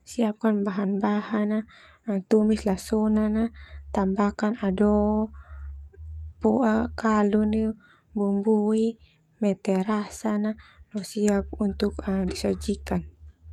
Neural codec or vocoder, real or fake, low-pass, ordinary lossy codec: codec, 44.1 kHz, 7.8 kbps, DAC; fake; 19.8 kHz; MP3, 96 kbps